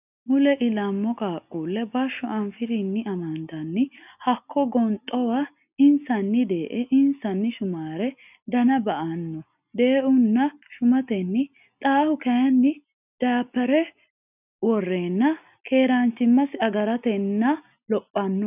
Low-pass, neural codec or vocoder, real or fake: 3.6 kHz; none; real